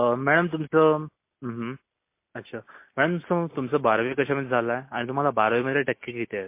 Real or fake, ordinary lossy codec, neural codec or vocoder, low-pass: real; MP3, 24 kbps; none; 3.6 kHz